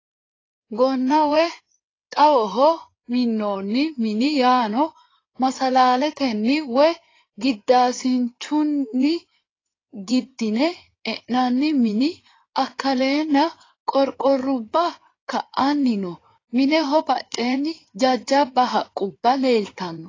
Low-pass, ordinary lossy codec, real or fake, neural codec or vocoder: 7.2 kHz; AAC, 32 kbps; fake; codec, 16 kHz in and 24 kHz out, 2.2 kbps, FireRedTTS-2 codec